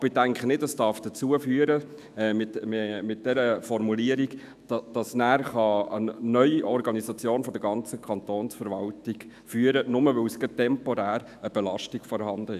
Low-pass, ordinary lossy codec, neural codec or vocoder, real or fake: 14.4 kHz; none; autoencoder, 48 kHz, 128 numbers a frame, DAC-VAE, trained on Japanese speech; fake